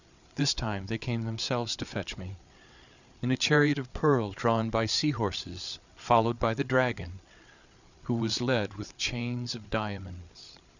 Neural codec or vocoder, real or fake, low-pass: codec, 16 kHz, 8 kbps, FreqCodec, larger model; fake; 7.2 kHz